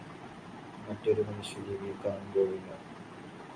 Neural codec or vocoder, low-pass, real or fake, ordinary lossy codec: none; 9.9 kHz; real; Opus, 64 kbps